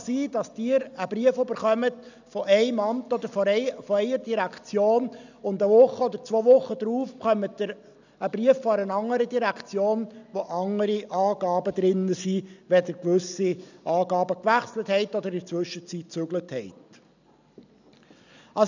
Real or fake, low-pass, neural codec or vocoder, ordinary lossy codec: real; 7.2 kHz; none; none